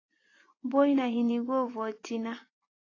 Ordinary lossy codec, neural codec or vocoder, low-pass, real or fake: AAC, 32 kbps; vocoder, 24 kHz, 100 mel bands, Vocos; 7.2 kHz; fake